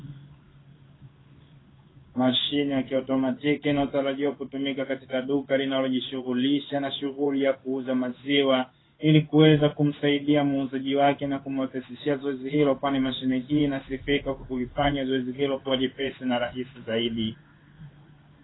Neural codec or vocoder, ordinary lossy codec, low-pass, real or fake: codec, 16 kHz in and 24 kHz out, 1 kbps, XY-Tokenizer; AAC, 16 kbps; 7.2 kHz; fake